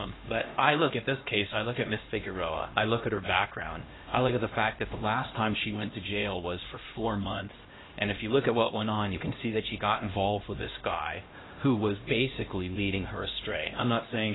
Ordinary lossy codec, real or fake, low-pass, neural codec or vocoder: AAC, 16 kbps; fake; 7.2 kHz; codec, 16 kHz, 1 kbps, X-Codec, HuBERT features, trained on LibriSpeech